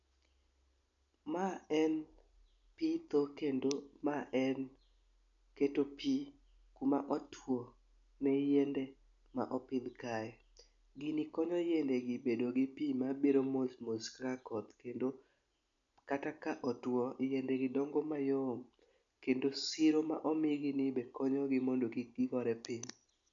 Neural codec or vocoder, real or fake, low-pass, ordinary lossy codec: none; real; 7.2 kHz; MP3, 64 kbps